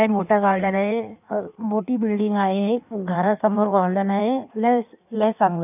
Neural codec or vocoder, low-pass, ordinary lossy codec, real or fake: codec, 16 kHz in and 24 kHz out, 1.1 kbps, FireRedTTS-2 codec; 3.6 kHz; none; fake